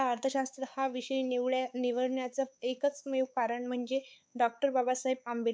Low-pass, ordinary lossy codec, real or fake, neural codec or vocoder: none; none; fake; codec, 16 kHz, 4 kbps, X-Codec, WavLM features, trained on Multilingual LibriSpeech